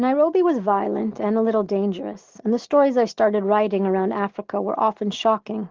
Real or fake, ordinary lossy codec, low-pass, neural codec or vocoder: real; Opus, 16 kbps; 7.2 kHz; none